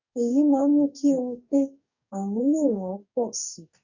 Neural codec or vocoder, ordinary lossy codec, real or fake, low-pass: codec, 44.1 kHz, 2.6 kbps, DAC; none; fake; 7.2 kHz